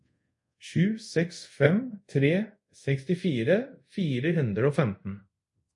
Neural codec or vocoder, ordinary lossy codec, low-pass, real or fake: codec, 24 kHz, 0.5 kbps, DualCodec; MP3, 48 kbps; 10.8 kHz; fake